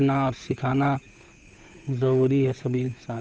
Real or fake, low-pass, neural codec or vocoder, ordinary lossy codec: fake; none; codec, 16 kHz, 8 kbps, FunCodec, trained on Chinese and English, 25 frames a second; none